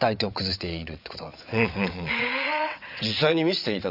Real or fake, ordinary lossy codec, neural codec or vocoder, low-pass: fake; none; vocoder, 22.05 kHz, 80 mel bands, WaveNeXt; 5.4 kHz